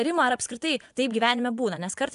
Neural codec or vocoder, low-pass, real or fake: vocoder, 24 kHz, 100 mel bands, Vocos; 10.8 kHz; fake